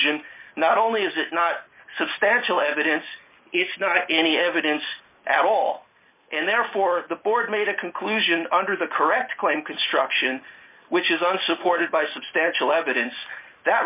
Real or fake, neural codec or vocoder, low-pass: real; none; 3.6 kHz